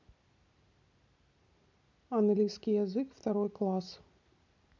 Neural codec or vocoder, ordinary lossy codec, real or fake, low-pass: none; none; real; 7.2 kHz